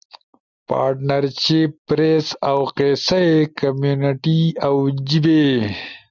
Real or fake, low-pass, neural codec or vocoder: real; 7.2 kHz; none